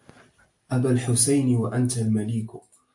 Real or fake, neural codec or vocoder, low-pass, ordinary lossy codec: real; none; 10.8 kHz; AAC, 64 kbps